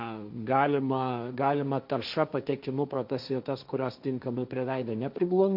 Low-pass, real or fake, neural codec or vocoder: 5.4 kHz; fake; codec, 16 kHz, 1.1 kbps, Voila-Tokenizer